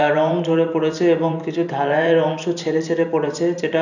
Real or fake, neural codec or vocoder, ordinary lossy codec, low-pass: fake; vocoder, 44.1 kHz, 128 mel bands every 512 samples, BigVGAN v2; none; 7.2 kHz